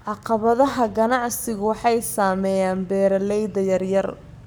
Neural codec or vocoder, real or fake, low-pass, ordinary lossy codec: codec, 44.1 kHz, 7.8 kbps, Pupu-Codec; fake; none; none